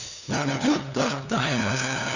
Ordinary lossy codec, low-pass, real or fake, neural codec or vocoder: none; 7.2 kHz; fake; codec, 16 kHz, 2 kbps, FunCodec, trained on LibriTTS, 25 frames a second